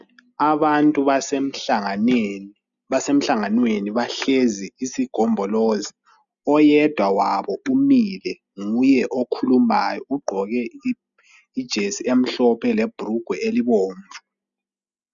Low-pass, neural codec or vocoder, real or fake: 7.2 kHz; none; real